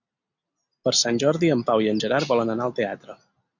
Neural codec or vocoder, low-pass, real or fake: none; 7.2 kHz; real